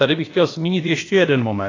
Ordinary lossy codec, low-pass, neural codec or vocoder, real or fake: AAC, 32 kbps; 7.2 kHz; codec, 16 kHz, about 1 kbps, DyCAST, with the encoder's durations; fake